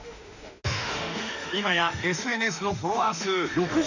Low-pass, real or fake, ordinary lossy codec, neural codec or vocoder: 7.2 kHz; fake; none; autoencoder, 48 kHz, 32 numbers a frame, DAC-VAE, trained on Japanese speech